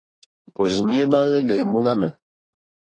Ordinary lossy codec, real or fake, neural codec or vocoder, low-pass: AAC, 32 kbps; fake; codec, 24 kHz, 1 kbps, SNAC; 9.9 kHz